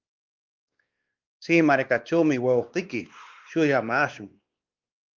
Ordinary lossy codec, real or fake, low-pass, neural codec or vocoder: Opus, 32 kbps; fake; 7.2 kHz; codec, 16 kHz, 2 kbps, X-Codec, WavLM features, trained on Multilingual LibriSpeech